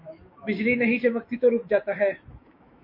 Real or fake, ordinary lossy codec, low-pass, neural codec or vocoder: fake; MP3, 32 kbps; 5.4 kHz; autoencoder, 48 kHz, 128 numbers a frame, DAC-VAE, trained on Japanese speech